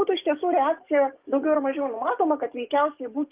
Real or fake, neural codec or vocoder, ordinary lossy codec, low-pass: fake; codec, 44.1 kHz, 7.8 kbps, DAC; Opus, 32 kbps; 3.6 kHz